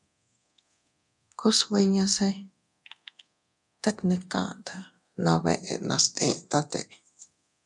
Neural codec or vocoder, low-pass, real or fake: codec, 24 kHz, 0.9 kbps, DualCodec; 10.8 kHz; fake